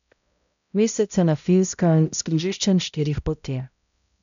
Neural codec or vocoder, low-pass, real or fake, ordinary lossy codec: codec, 16 kHz, 0.5 kbps, X-Codec, HuBERT features, trained on balanced general audio; 7.2 kHz; fake; none